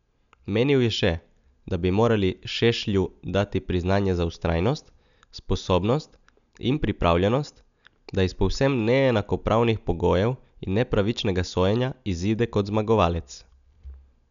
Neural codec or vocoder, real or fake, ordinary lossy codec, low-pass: none; real; none; 7.2 kHz